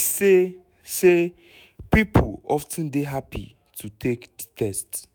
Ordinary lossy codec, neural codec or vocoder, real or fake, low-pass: none; autoencoder, 48 kHz, 128 numbers a frame, DAC-VAE, trained on Japanese speech; fake; none